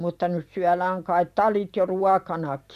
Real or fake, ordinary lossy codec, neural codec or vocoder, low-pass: fake; none; vocoder, 44.1 kHz, 128 mel bands every 512 samples, BigVGAN v2; 14.4 kHz